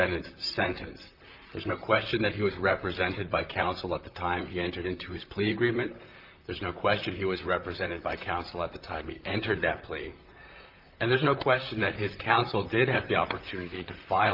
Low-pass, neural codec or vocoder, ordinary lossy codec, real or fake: 5.4 kHz; vocoder, 44.1 kHz, 128 mel bands, Pupu-Vocoder; Opus, 24 kbps; fake